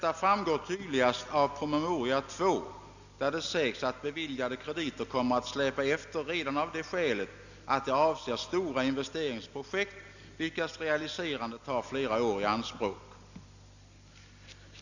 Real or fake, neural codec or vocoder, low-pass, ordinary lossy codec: real; none; 7.2 kHz; none